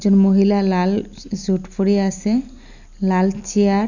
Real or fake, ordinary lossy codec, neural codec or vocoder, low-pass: real; none; none; 7.2 kHz